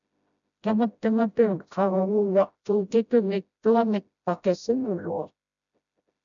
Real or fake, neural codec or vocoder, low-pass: fake; codec, 16 kHz, 0.5 kbps, FreqCodec, smaller model; 7.2 kHz